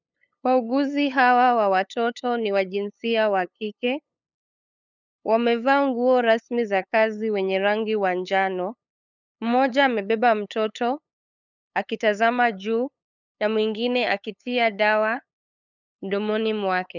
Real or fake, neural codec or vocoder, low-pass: fake; codec, 16 kHz, 8 kbps, FunCodec, trained on LibriTTS, 25 frames a second; 7.2 kHz